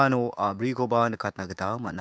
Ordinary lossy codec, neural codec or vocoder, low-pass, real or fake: none; codec, 16 kHz, 6 kbps, DAC; none; fake